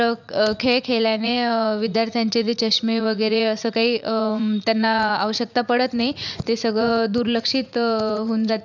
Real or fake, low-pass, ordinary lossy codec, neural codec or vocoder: fake; 7.2 kHz; none; vocoder, 44.1 kHz, 80 mel bands, Vocos